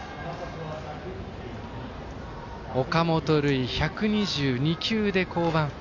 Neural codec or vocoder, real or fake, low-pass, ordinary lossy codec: none; real; 7.2 kHz; AAC, 48 kbps